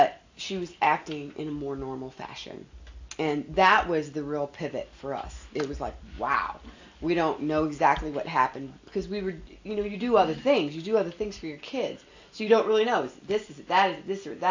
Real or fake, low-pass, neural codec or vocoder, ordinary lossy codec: real; 7.2 kHz; none; AAC, 48 kbps